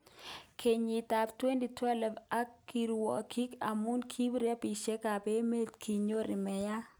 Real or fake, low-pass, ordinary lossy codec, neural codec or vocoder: real; none; none; none